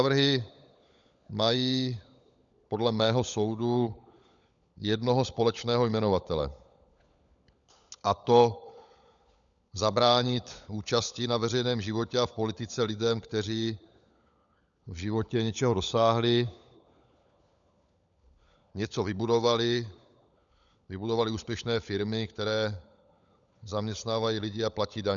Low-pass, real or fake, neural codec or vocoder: 7.2 kHz; fake; codec, 16 kHz, 16 kbps, FunCodec, trained on LibriTTS, 50 frames a second